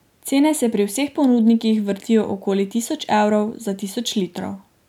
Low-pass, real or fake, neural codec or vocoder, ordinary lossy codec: 19.8 kHz; real; none; none